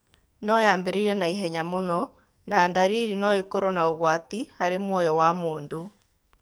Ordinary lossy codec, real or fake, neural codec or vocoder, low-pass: none; fake; codec, 44.1 kHz, 2.6 kbps, SNAC; none